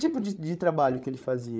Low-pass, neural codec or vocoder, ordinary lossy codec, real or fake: none; codec, 16 kHz, 8 kbps, FreqCodec, larger model; none; fake